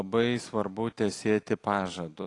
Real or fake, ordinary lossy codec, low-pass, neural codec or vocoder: real; AAC, 48 kbps; 10.8 kHz; none